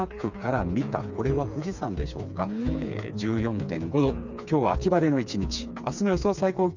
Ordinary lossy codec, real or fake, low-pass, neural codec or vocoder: none; fake; 7.2 kHz; codec, 16 kHz, 4 kbps, FreqCodec, smaller model